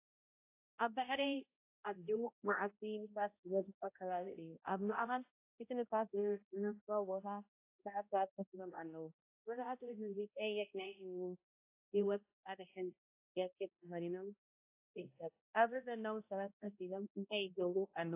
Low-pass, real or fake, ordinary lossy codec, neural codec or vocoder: 3.6 kHz; fake; AAC, 24 kbps; codec, 16 kHz, 0.5 kbps, X-Codec, HuBERT features, trained on balanced general audio